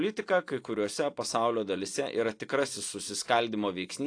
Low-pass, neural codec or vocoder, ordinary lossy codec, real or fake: 9.9 kHz; none; AAC, 48 kbps; real